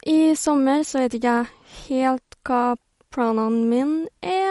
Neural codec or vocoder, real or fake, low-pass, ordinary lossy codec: none; real; 19.8 kHz; MP3, 48 kbps